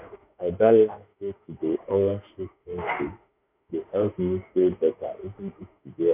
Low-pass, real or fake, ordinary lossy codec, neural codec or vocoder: 3.6 kHz; fake; none; autoencoder, 48 kHz, 32 numbers a frame, DAC-VAE, trained on Japanese speech